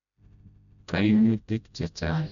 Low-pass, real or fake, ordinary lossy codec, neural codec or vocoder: 7.2 kHz; fake; none; codec, 16 kHz, 0.5 kbps, FreqCodec, smaller model